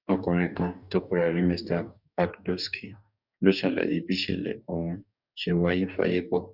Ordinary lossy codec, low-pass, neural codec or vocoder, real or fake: none; 5.4 kHz; codec, 44.1 kHz, 2.6 kbps, DAC; fake